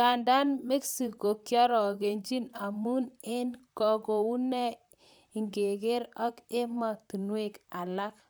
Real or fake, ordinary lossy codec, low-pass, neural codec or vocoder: fake; none; none; vocoder, 44.1 kHz, 128 mel bands, Pupu-Vocoder